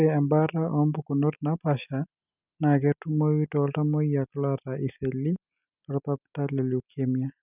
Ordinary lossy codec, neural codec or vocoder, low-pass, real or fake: none; none; 3.6 kHz; real